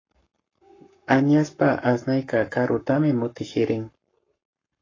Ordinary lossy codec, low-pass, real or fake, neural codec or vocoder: AAC, 32 kbps; 7.2 kHz; fake; codec, 44.1 kHz, 7.8 kbps, Pupu-Codec